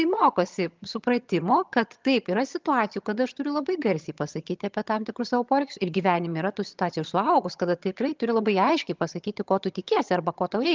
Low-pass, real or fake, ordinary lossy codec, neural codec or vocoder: 7.2 kHz; fake; Opus, 32 kbps; vocoder, 22.05 kHz, 80 mel bands, HiFi-GAN